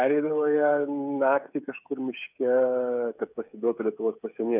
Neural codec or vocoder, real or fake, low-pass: codec, 16 kHz, 8 kbps, FreqCodec, smaller model; fake; 3.6 kHz